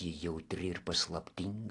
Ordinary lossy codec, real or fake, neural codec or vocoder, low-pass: AAC, 48 kbps; real; none; 10.8 kHz